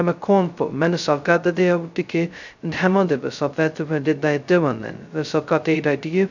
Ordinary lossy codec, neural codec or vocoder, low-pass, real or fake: none; codec, 16 kHz, 0.2 kbps, FocalCodec; 7.2 kHz; fake